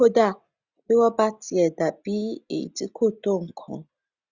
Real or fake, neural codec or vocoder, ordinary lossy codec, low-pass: real; none; Opus, 64 kbps; 7.2 kHz